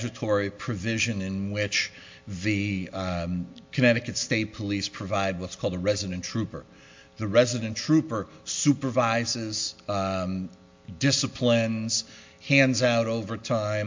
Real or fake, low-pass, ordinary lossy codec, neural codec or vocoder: real; 7.2 kHz; MP3, 48 kbps; none